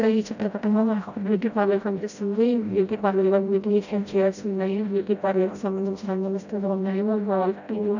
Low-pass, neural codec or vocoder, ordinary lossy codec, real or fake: 7.2 kHz; codec, 16 kHz, 0.5 kbps, FreqCodec, smaller model; none; fake